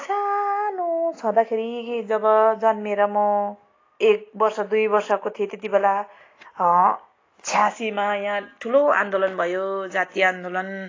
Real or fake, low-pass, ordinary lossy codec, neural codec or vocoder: real; 7.2 kHz; AAC, 32 kbps; none